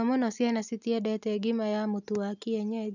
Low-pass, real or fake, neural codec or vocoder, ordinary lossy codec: 7.2 kHz; real; none; none